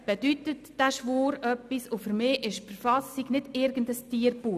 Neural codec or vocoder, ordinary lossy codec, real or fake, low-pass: none; none; real; 14.4 kHz